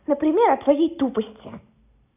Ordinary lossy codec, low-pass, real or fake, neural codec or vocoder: none; 3.6 kHz; real; none